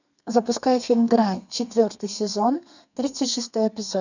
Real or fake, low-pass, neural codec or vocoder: fake; 7.2 kHz; codec, 32 kHz, 1.9 kbps, SNAC